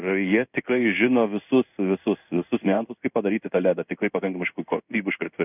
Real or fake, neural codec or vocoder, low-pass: fake; codec, 16 kHz in and 24 kHz out, 1 kbps, XY-Tokenizer; 3.6 kHz